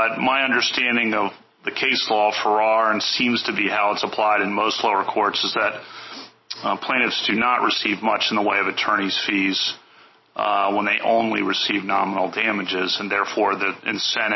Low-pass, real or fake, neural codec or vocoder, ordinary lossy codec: 7.2 kHz; real; none; MP3, 24 kbps